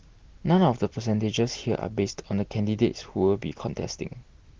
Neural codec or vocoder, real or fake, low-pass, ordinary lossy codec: none; real; 7.2 kHz; Opus, 16 kbps